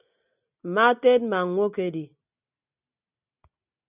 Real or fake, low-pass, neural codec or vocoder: real; 3.6 kHz; none